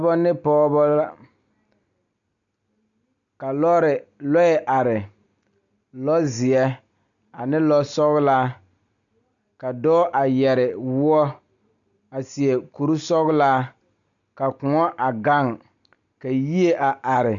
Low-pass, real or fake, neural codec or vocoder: 7.2 kHz; real; none